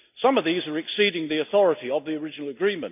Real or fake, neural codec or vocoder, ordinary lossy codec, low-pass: real; none; none; 3.6 kHz